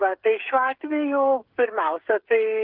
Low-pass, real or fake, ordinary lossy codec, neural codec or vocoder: 5.4 kHz; fake; Opus, 16 kbps; codec, 16 kHz, 16 kbps, FreqCodec, smaller model